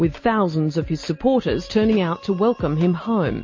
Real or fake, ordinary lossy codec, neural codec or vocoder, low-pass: real; MP3, 32 kbps; none; 7.2 kHz